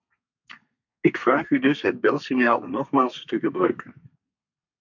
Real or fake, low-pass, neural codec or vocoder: fake; 7.2 kHz; codec, 32 kHz, 1.9 kbps, SNAC